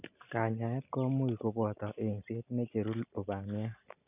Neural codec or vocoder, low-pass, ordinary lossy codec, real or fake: none; 3.6 kHz; none; real